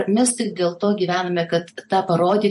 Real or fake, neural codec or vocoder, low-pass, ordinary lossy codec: fake; vocoder, 44.1 kHz, 128 mel bands every 256 samples, BigVGAN v2; 14.4 kHz; MP3, 48 kbps